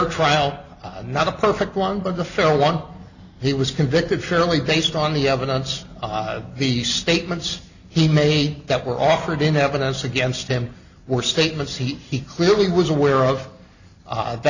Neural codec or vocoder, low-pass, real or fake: none; 7.2 kHz; real